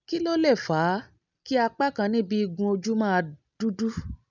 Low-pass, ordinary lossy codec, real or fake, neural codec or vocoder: 7.2 kHz; none; real; none